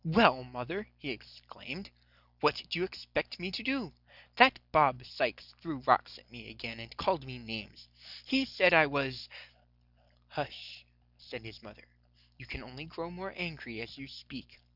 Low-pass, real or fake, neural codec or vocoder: 5.4 kHz; real; none